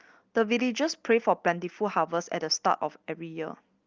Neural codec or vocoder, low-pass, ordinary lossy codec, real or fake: none; 7.2 kHz; Opus, 32 kbps; real